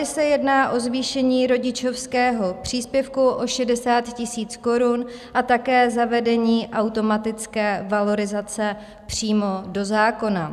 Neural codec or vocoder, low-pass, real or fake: none; 14.4 kHz; real